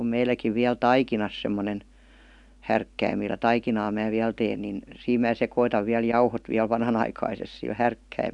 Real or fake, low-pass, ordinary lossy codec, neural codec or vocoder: real; 10.8 kHz; none; none